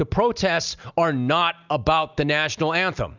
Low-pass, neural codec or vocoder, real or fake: 7.2 kHz; none; real